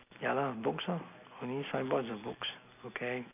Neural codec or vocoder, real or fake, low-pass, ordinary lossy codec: none; real; 3.6 kHz; none